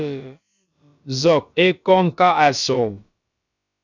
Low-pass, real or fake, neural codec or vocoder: 7.2 kHz; fake; codec, 16 kHz, about 1 kbps, DyCAST, with the encoder's durations